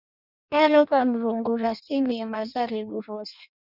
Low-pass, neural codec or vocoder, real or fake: 5.4 kHz; codec, 16 kHz in and 24 kHz out, 0.6 kbps, FireRedTTS-2 codec; fake